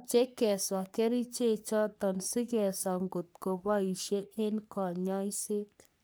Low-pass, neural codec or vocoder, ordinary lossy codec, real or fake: none; codec, 44.1 kHz, 3.4 kbps, Pupu-Codec; none; fake